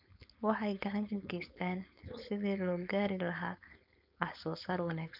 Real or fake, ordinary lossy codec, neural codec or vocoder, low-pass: fake; none; codec, 16 kHz, 4.8 kbps, FACodec; 5.4 kHz